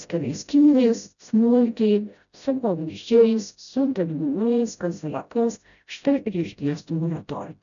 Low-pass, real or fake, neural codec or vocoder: 7.2 kHz; fake; codec, 16 kHz, 0.5 kbps, FreqCodec, smaller model